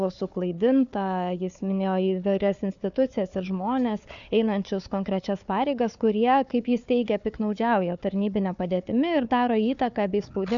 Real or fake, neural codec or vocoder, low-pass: fake; codec, 16 kHz, 4 kbps, FunCodec, trained on Chinese and English, 50 frames a second; 7.2 kHz